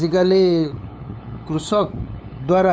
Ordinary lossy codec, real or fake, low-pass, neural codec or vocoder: none; fake; none; codec, 16 kHz, 8 kbps, FunCodec, trained on LibriTTS, 25 frames a second